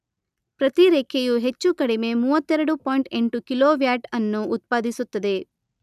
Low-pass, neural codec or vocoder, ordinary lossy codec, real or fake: 14.4 kHz; none; none; real